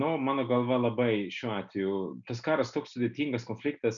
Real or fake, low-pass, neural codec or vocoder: real; 7.2 kHz; none